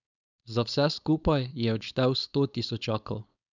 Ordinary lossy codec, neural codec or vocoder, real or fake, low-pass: none; codec, 16 kHz, 4.8 kbps, FACodec; fake; 7.2 kHz